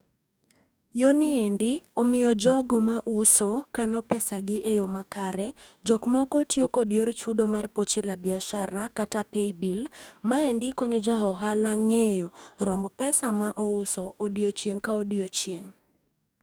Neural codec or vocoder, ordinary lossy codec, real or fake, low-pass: codec, 44.1 kHz, 2.6 kbps, DAC; none; fake; none